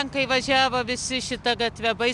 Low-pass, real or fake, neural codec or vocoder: 10.8 kHz; real; none